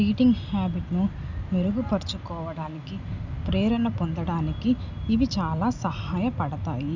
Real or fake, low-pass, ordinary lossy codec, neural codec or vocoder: real; 7.2 kHz; none; none